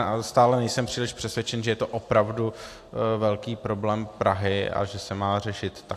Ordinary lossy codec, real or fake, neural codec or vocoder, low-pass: AAC, 64 kbps; real; none; 14.4 kHz